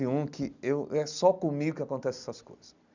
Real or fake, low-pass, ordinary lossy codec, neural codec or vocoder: real; 7.2 kHz; none; none